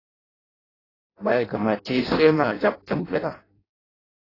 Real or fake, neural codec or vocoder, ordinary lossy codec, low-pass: fake; codec, 16 kHz in and 24 kHz out, 0.6 kbps, FireRedTTS-2 codec; AAC, 24 kbps; 5.4 kHz